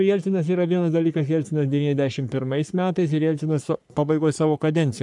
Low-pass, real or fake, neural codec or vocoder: 10.8 kHz; fake; codec, 44.1 kHz, 3.4 kbps, Pupu-Codec